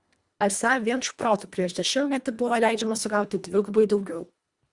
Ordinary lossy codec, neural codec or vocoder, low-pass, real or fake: Opus, 64 kbps; codec, 24 kHz, 1.5 kbps, HILCodec; 10.8 kHz; fake